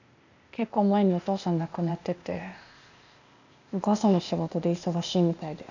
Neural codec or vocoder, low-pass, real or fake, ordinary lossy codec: codec, 16 kHz, 0.8 kbps, ZipCodec; 7.2 kHz; fake; none